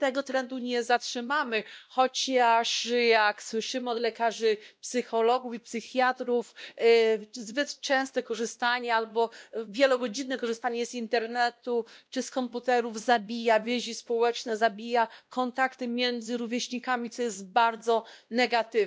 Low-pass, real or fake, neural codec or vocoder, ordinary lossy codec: none; fake; codec, 16 kHz, 1 kbps, X-Codec, WavLM features, trained on Multilingual LibriSpeech; none